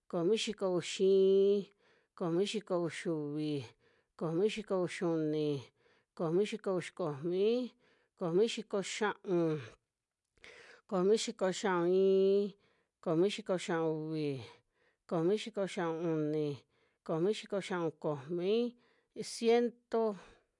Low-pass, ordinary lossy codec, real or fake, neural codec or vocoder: 10.8 kHz; none; real; none